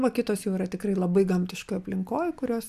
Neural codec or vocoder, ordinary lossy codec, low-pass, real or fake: none; AAC, 96 kbps; 14.4 kHz; real